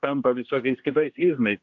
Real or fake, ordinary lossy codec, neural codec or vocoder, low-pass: fake; AAC, 48 kbps; codec, 16 kHz, 1 kbps, X-Codec, HuBERT features, trained on general audio; 7.2 kHz